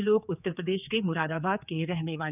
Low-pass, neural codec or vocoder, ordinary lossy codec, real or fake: 3.6 kHz; codec, 16 kHz, 4 kbps, X-Codec, HuBERT features, trained on general audio; none; fake